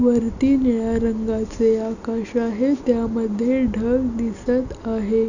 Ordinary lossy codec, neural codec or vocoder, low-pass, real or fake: none; none; 7.2 kHz; real